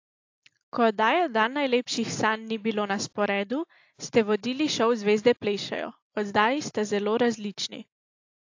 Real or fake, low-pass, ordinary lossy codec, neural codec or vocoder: real; 7.2 kHz; AAC, 48 kbps; none